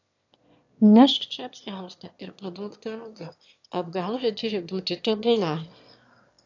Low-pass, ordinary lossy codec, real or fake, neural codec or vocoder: 7.2 kHz; AAC, 48 kbps; fake; autoencoder, 22.05 kHz, a latent of 192 numbers a frame, VITS, trained on one speaker